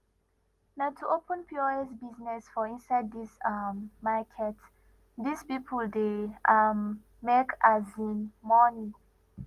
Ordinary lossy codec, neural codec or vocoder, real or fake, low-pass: Opus, 24 kbps; none; real; 14.4 kHz